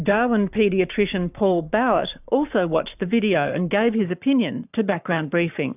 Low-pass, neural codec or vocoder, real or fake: 3.6 kHz; codec, 44.1 kHz, 7.8 kbps, Pupu-Codec; fake